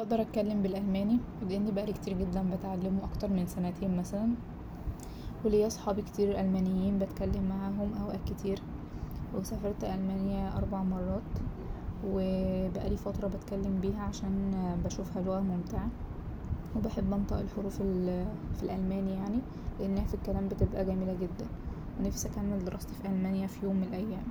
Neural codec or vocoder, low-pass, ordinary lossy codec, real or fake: none; none; none; real